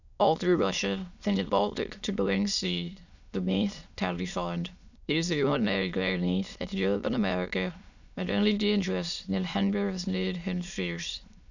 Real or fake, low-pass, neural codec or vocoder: fake; 7.2 kHz; autoencoder, 22.05 kHz, a latent of 192 numbers a frame, VITS, trained on many speakers